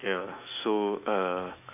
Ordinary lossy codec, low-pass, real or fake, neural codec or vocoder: none; 3.6 kHz; fake; autoencoder, 48 kHz, 128 numbers a frame, DAC-VAE, trained on Japanese speech